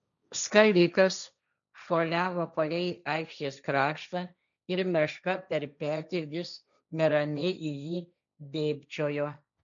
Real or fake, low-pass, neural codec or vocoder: fake; 7.2 kHz; codec, 16 kHz, 1.1 kbps, Voila-Tokenizer